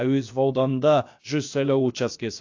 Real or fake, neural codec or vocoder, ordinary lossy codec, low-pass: fake; codec, 16 kHz, about 1 kbps, DyCAST, with the encoder's durations; AAC, 48 kbps; 7.2 kHz